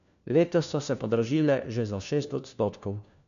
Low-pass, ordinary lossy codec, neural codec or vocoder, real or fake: 7.2 kHz; none; codec, 16 kHz, 1 kbps, FunCodec, trained on LibriTTS, 50 frames a second; fake